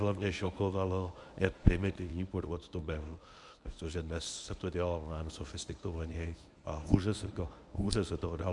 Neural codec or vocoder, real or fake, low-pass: codec, 24 kHz, 0.9 kbps, WavTokenizer, medium speech release version 1; fake; 10.8 kHz